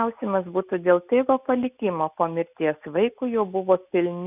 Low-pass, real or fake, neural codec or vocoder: 3.6 kHz; real; none